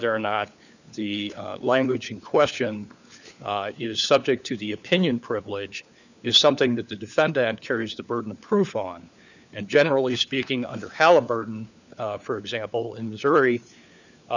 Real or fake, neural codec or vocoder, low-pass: fake; codec, 16 kHz, 4 kbps, FunCodec, trained on LibriTTS, 50 frames a second; 7.2 kHz